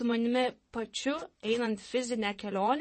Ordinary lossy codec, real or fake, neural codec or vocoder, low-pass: MP3, 32 kbps; fake; vocoder, 22.05 kHz, 80 mel bands, WaveNeXt; 9.9 kHz